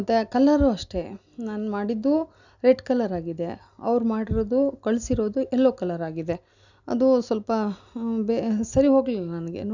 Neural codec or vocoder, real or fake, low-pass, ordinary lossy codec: none; real; 7.2 kHz; none